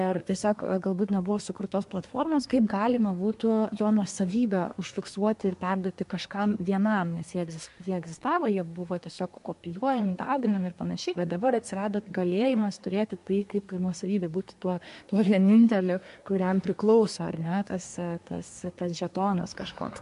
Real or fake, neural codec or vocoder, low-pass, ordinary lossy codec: fake; codec, 24 kHz, 1 kbps, SNAC; 10.8 kHz; MP3, 64 kbps